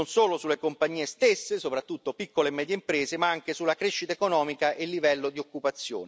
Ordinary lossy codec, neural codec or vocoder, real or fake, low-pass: none; none; real; none